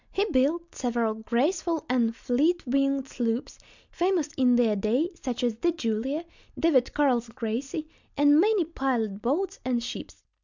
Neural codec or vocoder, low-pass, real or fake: none; 7.2 kHz; real